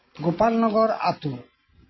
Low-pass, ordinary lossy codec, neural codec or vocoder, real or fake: 7.2 kHz; MP3, 24 kbps; none; real